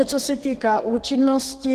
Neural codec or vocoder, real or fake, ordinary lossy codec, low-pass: codec, 32 kHz, 1.9 kbps, SNAC; fake; Opus, 16 kbps; 14.4 kHz